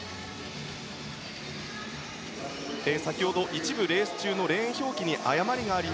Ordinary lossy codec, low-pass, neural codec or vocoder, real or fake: none; none; none; real